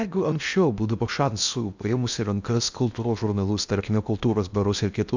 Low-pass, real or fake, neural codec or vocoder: 7.2 kHz; fake; codec, 16 kHz in and 24 kHz out, 0.6 kbps, FocalCodec, streaming, 2048 codes